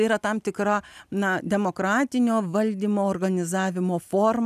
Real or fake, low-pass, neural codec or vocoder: real; 14.4 kHz; none